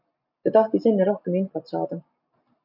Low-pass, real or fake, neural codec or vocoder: 5.4 kHz; real; none